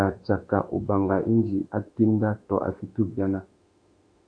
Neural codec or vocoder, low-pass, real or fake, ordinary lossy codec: autoencoder, 48 kHz, 32 numbers a frame, DAC-VAE, trained on Japanese speech; 9.9 kHz; fake; MP3, 64 kbps